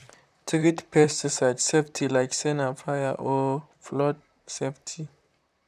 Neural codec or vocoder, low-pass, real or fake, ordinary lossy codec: vocoder, 44.1 kHz, 128 mel bands, Pupu-Vocoder; 14.4 kHz; fake; none